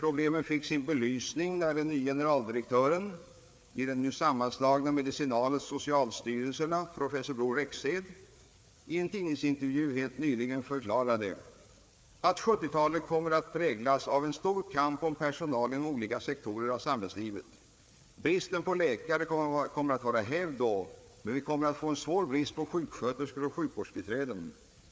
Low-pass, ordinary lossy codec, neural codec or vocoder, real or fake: none; none; codec, 16 kHz, 4 kbps, FreqCodec, larger model; fake